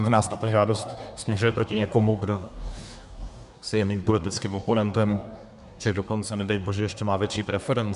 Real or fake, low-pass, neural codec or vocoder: fake; 10.8 kHz; codec, 24 kHz, 1 kbps, SNAC